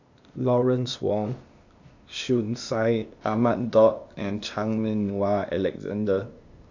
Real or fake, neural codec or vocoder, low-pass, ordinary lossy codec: fake; codec, 16 kHz, 0.8 kbps, ZipCodec; 7.2 kHz; none